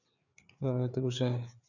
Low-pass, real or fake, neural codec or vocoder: 7.2 kHz; fake; codec, 16 kHz, 4 kbps, FreqCodec, larger model